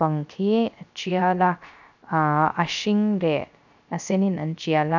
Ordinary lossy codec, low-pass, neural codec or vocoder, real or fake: none; 7.2 kHz; codec, 16 kHz, 0.3 kbps, FocalCodec; fake